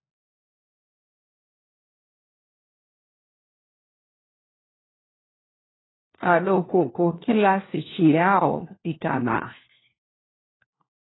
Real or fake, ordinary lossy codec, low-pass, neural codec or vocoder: fake; AAC, 16 kbps; 7.2 kHz; codec, 16 kHz, 1 kbps, FunCodec, trained on LibriTTS, 50 frames a second